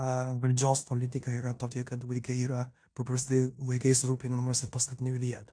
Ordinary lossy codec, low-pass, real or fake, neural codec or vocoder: AAC, 64 kbps; 9.9 kHz; fake; codec, 16 kHz in and 24 kHz out, 0.9 kbps, LongCat-Audio-Codec, four codebook decoder